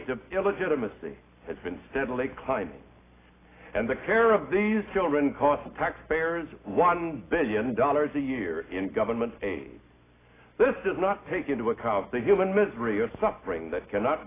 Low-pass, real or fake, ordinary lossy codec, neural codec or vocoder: 3.6 kHz; real; AAC, 16 kbps; none